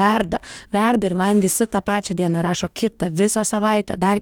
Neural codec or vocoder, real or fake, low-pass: codec, 44.1 kHz, 2.6 kbps, DAC; fake; 19.8 kHz